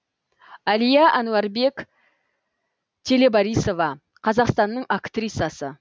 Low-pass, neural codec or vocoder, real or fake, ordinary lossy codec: none; none; real; none